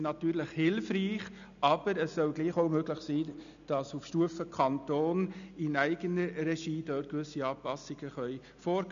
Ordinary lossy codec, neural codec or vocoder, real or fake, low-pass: none; none; real; 7.2 kHz